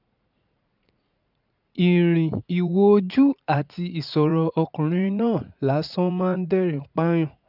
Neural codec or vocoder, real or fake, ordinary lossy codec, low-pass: vocoder, 44.1 kHz, 80 mel bands, Vocos; fake; none; 5.4 kHz